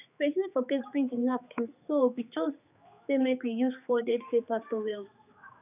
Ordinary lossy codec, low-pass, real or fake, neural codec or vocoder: none; 3.6 kHz; fake; codec, 16 kHz, 4 kbps, X-Codec, HuBERT features, trained on general audio